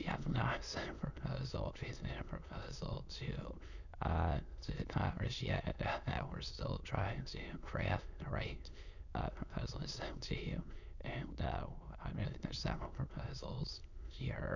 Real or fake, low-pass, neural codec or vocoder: fake; 7.2 kHz; autoencoder, 22.05 kHz, a latent of 192 numbers a frame, VITS, trained on many speakers